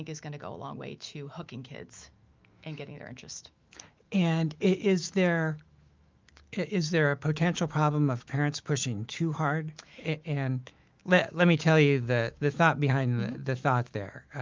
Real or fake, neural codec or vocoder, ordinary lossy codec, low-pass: fake; autoencoder, 48 kHz, 128 numbers a frame, DAC-VAE, trained on Japanese speech; Opus, 32 kbps; 7.2 kHz